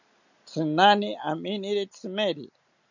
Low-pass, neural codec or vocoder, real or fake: 7.2 kHz; none; real